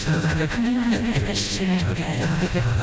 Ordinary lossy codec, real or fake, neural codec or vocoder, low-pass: none; fake; codec, 16 kHz, 0.5 kbps, FreqCodec, smaller model; none